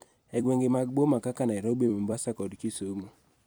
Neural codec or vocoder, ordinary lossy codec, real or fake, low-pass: vocoder, 44.1 kHz, 128 mel bands every 256 samples, BigVGAN v2; none; fake; none